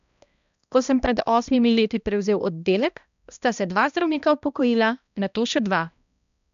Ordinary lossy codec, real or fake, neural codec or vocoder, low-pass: none; fake; codec, 16 kHz, 1 kbps, X-Codec, HuBERT features, trained on balanced general audio; 7.2 kHz